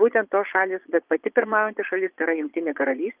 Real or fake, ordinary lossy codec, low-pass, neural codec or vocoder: fake; Opus, 32 kbps; 3.6 kHz; vocoder, 22.05 kHz, 80 mel bands, Vocos